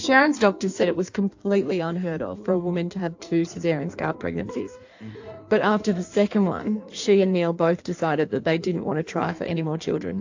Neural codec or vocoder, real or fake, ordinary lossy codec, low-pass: codec, 16 kHz in and 24 kHz out, 1.1 kbps, FireRedTTS-2 codec; fake; AAC, 48 kbps; 7.2 kHz